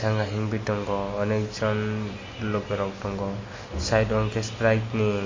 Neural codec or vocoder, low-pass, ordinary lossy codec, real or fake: none; 7.2 kHz; MP3, 32 kbps; real